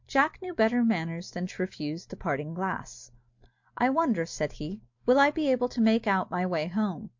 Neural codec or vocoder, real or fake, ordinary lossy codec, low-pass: none; real; MP3, 48 kbps; 7.2 kHz